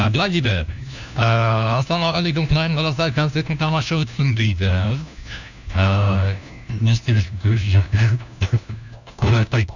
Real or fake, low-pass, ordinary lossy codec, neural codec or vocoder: fake; 7.2 kHz; none; codec, 16 kHz, 1 kbps, FunCodec, trained on LibriTTS, 50 frames a second